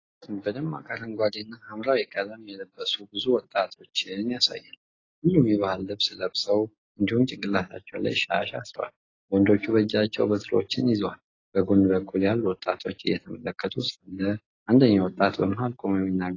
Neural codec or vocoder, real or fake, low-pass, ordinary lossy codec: none; real; 7.2 kHz; AAC, 32 kbps